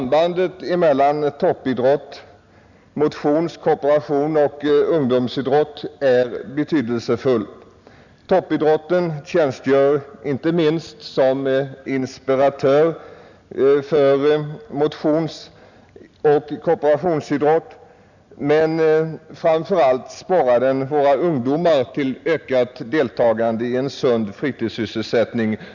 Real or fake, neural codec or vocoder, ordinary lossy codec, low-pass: real; none; none; 7.2 kHz